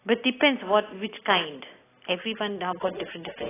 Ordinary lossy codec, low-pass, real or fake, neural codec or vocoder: AAC, 16 kbps; 3.6 kHz; real; none